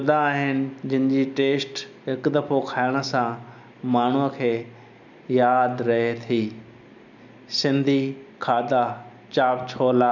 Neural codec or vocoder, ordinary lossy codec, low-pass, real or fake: none; none; 7.2 kHz; real